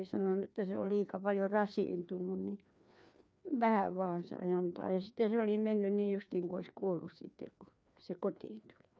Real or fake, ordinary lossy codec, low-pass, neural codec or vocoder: fake; none; none; codec, 16 kHz, 2 kbps, FreqCodec, larger model